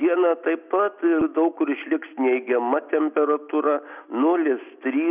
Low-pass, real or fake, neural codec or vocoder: 3.6 kHz; real; none